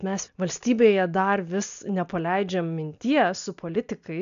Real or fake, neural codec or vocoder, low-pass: real; none; 7.2 kHz